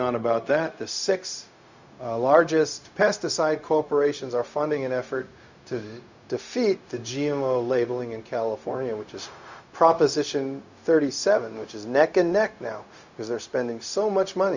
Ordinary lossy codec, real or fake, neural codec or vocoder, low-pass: Opus, 64 kbps; fake; codec, 16 kHz, 0.4 kbps, LongCat-Audio-Codec; 7.2 kHz